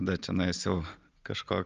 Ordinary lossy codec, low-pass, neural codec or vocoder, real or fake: Opus, 32 kbps; 7.2 kHz; none; real